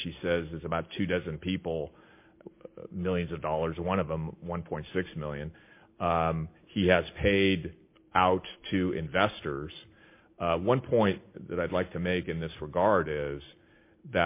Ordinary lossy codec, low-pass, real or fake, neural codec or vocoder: MP3, 24 kbps; 3.6 kHz; real; none